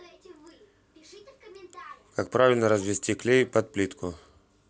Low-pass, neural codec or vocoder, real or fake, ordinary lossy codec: none; none; real; none